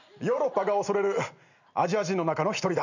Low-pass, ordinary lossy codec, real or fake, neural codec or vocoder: 7.2 kHz; none; real; none